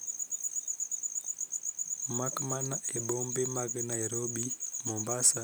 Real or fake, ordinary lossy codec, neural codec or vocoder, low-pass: real; none; none; none